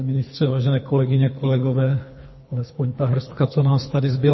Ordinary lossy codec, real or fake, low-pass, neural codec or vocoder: MP3, 24 kbps; fake; 7.2 kHz; codec, 24 kHz, 3 kbps, HILCodec